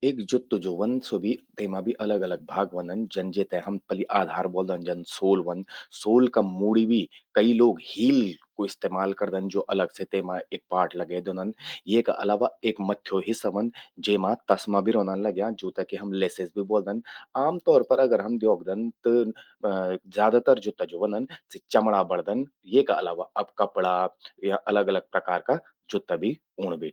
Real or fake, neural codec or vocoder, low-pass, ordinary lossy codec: real; none; 19.8 kHz; Opus, 24 kbps